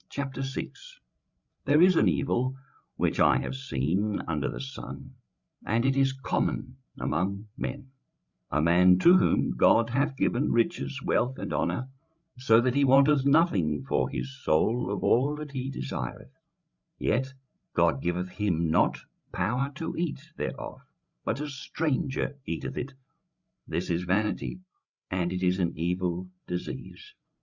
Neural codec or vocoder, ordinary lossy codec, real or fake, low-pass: codec, 16 kHz, 16 kbps, FreqCodec, larger model; Opus, 64 kbps; fake; 7.2 kHz